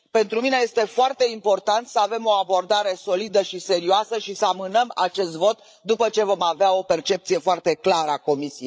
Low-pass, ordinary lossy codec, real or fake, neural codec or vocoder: none; none; fake; codec, 16 kHz, 16 kbps, FreqCodec, larger model